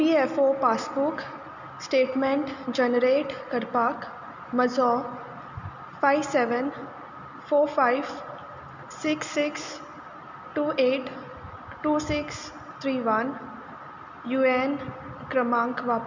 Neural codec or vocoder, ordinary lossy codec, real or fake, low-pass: none; none; real; 7.2 kHz